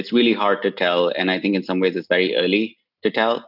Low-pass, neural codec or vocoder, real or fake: 5.4 kHz; none; real